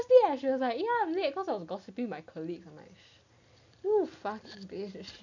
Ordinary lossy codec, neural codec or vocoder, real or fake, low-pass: none; none; real; 7.2 kHz